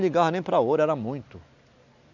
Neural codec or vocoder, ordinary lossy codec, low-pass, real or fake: none; none; 7.2 kHz; real